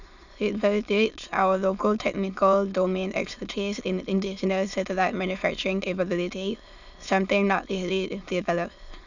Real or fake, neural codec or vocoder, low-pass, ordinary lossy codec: fake; autoencoder, 22.05 kHz, a latent of 192 numbers a frame, VITS, trained on many speakers; 7.2 kHz; none